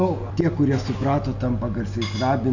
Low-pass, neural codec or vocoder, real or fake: 7.2 kHz; none; real